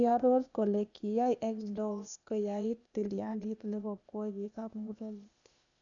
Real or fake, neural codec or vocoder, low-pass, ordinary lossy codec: fake; codec, 16 kHz, 0.8 kbps, ZipCodec; 7.2 kHz; none